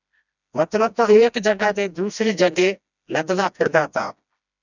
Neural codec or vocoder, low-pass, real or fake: codec, 16 kHz, 1 kbps, FreqCodec, smaller model; 7.2 kHz; fake